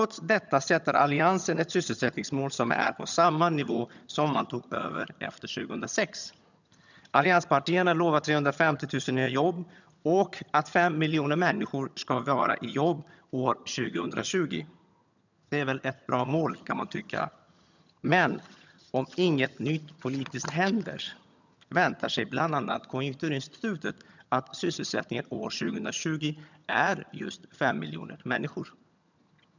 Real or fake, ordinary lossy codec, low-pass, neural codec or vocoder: fake; none; 7.2 kHz; vocoder, 22.05 kHz, 80 mel bands, HiFi-GAN